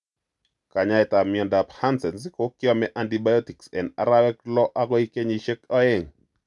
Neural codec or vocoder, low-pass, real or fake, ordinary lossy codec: none; 10.8 kHz; real; none